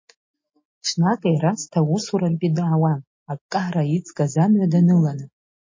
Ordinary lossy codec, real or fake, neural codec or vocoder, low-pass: MP3, 32 kbps; real; none; 7.2 kHz